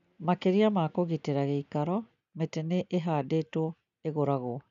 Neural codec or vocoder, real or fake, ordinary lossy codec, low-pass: none; real; none; 7.2 kHz